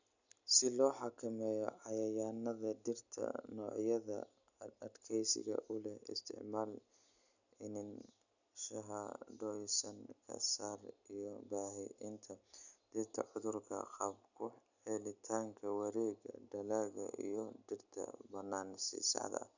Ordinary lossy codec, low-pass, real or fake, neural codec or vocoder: none; 7.2 kHz; real; none